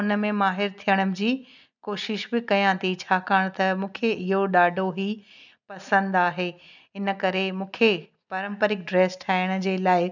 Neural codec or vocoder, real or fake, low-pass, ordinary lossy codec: none; real; 7.2 kHz; none